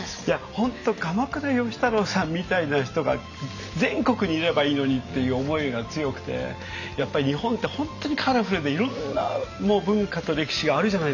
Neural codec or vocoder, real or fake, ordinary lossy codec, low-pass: none; real; none; 7.2 kHz